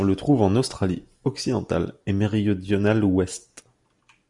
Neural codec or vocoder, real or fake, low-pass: none; real; 10.8 kHz